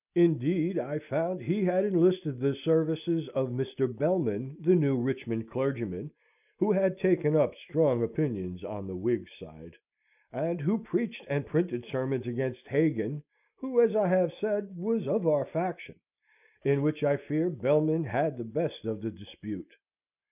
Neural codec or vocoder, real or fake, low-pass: none; real; 3.6 kHz